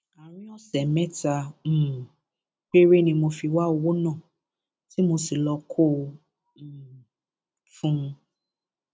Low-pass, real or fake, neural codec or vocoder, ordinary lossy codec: none; real; none; none